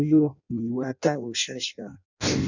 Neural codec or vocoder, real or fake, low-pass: codec, 16 kHz in and 24 kHz out, 0.6 kbps, FireRedTTS-2 codec; fake; 7.2 kHz